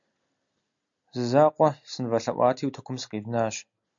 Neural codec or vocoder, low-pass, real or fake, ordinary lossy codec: none; 7.2 kHz; real; MP3, 64 kbps